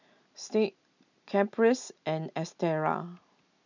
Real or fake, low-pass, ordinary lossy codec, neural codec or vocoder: real; 7.2 kHz; none; none